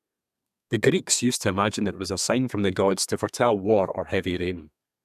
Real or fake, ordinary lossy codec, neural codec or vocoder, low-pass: fake; none; codec, 32 kHz, 1.9 kbps, SNAC; 14.4 kHz